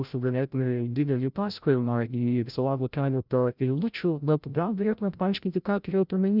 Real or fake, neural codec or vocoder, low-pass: fake; codec, 16 kHz, 0.5 kbps, FreqCodec, larger model; 5.4 kHz